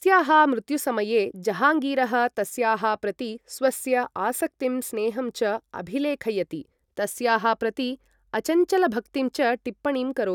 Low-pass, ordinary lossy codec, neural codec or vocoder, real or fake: 19.8 kHz; none; none; real